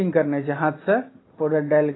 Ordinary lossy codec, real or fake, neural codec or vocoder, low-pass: AAC, 16 kbps; real; none; 7.2 kHz